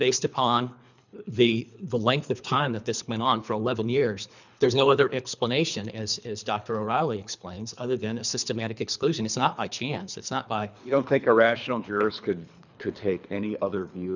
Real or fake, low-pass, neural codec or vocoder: fake; 7.2 kHz; codec, 24 kHz, 3 kbps, HILCodec